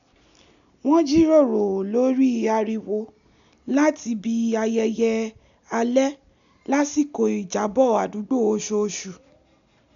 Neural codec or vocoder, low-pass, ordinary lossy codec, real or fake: none; 7.2 kHz; none; real